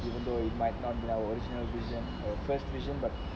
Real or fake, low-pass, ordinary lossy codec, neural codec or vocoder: real; none; none; none